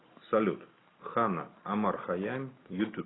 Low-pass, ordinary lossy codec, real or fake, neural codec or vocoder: 7.2 kHz; AAC, 16 kbps; real; none